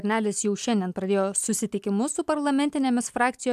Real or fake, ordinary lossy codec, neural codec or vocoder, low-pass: real; AAC, 96 kbps; none; 14.4 kHz